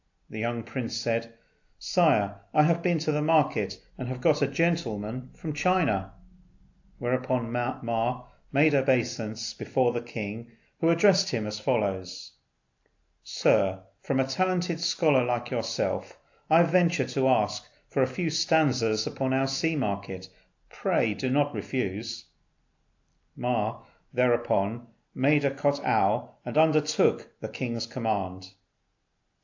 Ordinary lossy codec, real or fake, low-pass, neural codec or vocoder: AAC, 48 kbps; real; 7.2 kHz; none